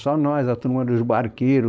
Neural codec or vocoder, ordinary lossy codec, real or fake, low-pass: codec, 16 kHz, 4.8 kbps, FACodec; none; fake; none